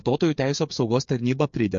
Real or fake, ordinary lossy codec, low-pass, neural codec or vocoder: fake; MP3, 48 kbps; 7.2 kHz; codec, 16 kHz, 8 kbps, FreqCodec, smaller model